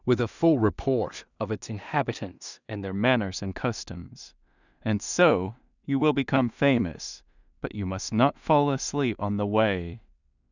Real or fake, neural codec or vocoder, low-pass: fake; codec, 16 kHz in and 24 kHz out, 0.4 kbps, LongCat-Audio-Codec, two codebook decoder; 7.2 kHz